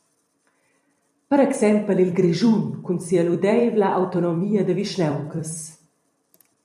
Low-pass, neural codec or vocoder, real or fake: 14.4 kHz; vocoder, 44.1 kHz, 128 mel bands every 256 samples, BigVGAN v2; fake